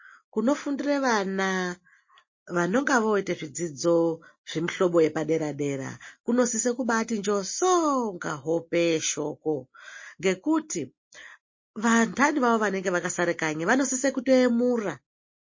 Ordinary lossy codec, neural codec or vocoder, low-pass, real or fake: MP3, 32 kbps; none; 7.2 kHz; real